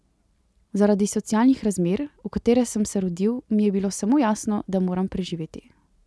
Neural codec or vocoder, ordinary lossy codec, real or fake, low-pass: none; none; real; none